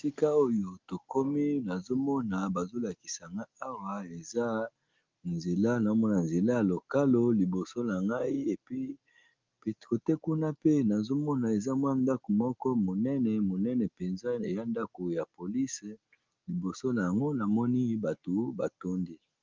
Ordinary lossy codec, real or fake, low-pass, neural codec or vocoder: Opus, 32 kbps; real; 7.2 kHz; none